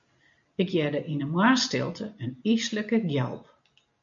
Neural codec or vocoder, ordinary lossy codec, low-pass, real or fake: none; MP3, 64 kbps; 7.2 kHz; real